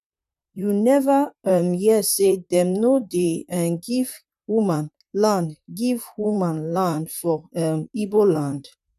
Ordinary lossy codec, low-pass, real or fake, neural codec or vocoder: none; 14.4 kHz; fake; vocoder, 44.1 kHz, 128 mel bands, Pupu-Vocoder